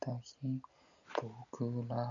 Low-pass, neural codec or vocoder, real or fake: 7.2 kHz; none; real